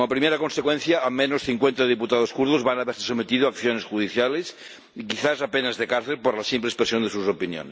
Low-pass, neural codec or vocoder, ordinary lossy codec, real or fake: none; none; none; real